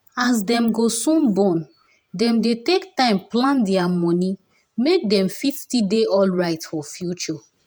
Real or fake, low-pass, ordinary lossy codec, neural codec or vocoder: fake; none; none; vocoder, 48 kHz, 128 mel bands, Vocos